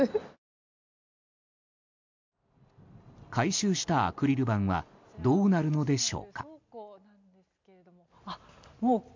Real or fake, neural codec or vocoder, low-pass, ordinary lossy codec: real; none; 7.2 kHz; MP3, 64 kbps